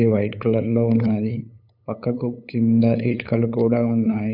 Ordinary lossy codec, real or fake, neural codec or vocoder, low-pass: none; fake; codec, 16 kHz, 8 kbps, FunCodec, trained on LibriTTS, 25 frames a second; 5.4 kHz